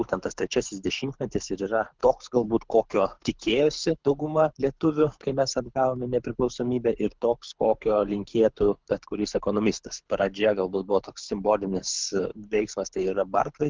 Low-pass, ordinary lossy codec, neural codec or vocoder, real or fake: 7.2 kHz; Opus, 24 kbps; codec, 24 kHz, 6 kbps, HILCodec; fake